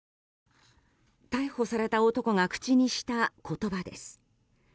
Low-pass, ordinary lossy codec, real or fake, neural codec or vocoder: none; none; real; none